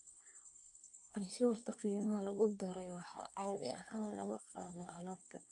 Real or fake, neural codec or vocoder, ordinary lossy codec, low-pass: fake; codec, 24 kHz, 1 kbps, SNAC; none; 10.8 kHz